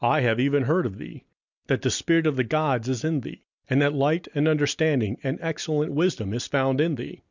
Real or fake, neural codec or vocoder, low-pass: real; none; 7.2 kHz